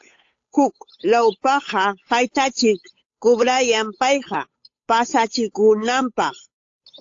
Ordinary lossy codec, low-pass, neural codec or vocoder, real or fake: AAC, 48 kbps; 7.2 kHz; codec, 16 kHz, 8 kbps, FunCodec, trained on Chinese and English, 25 frames a second; fake